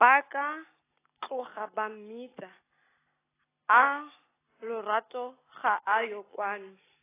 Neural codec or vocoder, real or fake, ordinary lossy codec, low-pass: none; real; AAC, 16 kbps; 3.6 kHz